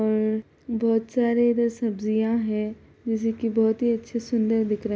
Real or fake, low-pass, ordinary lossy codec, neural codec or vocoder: real; none; none; none